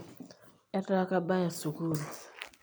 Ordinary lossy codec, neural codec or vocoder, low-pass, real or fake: none; none; none; real